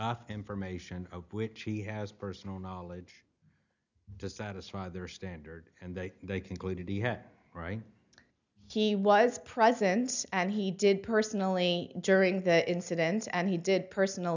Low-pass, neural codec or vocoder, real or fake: 7.2 kHz; none; real